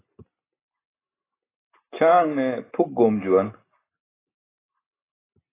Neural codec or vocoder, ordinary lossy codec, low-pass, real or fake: none; AAC, 16 kbps; 3.6 kHz; real